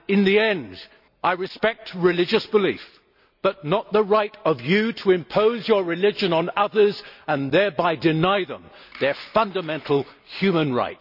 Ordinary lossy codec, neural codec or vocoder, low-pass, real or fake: none; none; 5.4 kHz; real